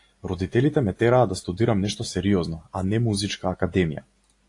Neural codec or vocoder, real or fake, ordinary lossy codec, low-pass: none; real; AAC, 48 kbps; 10.8 kHz